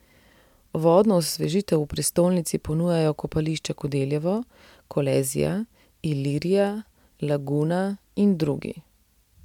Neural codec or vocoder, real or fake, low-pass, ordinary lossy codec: none; real; 19.8 kHz; MP3, 96 kbps